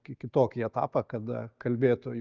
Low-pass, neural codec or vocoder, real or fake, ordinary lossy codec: 7.2 kHz; none; real; Opus, 24 kbps